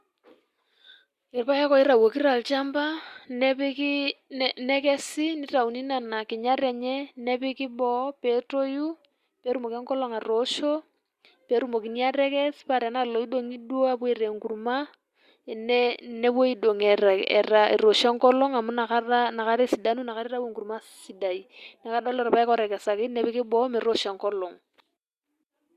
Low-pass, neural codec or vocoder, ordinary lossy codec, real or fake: 14.4 kHz; none; Opus, 64 kbps; real